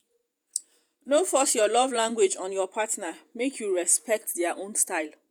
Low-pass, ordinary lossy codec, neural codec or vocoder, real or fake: none; none; vocoder, 48 kHz, 128 mel bands, Vocos; fake